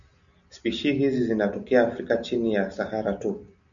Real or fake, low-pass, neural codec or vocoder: real; 7.2 kHz; none